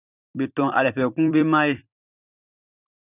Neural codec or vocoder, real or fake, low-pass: vocoder, 44.1 kHz, 128 mel bands every 256 samples, BigVGAN v2; fake; 3.6 kHz